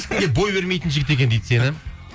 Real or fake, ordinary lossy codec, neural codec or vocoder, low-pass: real; none; none; none